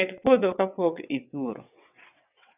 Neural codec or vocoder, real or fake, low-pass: vocoder, 22.05 kHz, 80 mel bands, WaveNeXt; fake; 3.6 kHz